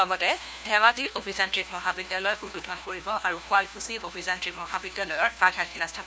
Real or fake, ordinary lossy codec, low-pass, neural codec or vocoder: fake; none; none; codec, 16 kHz, 1 kbps, FunCodec, trained on LibriTTS, 50 frames a second